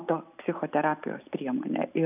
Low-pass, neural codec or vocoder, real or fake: 3.6 kHz; none; real